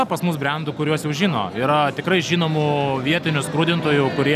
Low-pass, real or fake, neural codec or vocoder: 14.4 kHz; real; none